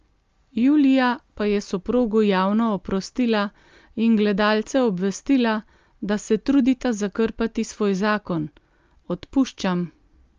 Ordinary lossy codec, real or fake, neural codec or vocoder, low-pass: Opus, 32 kbps; real; none; 7.2 kHz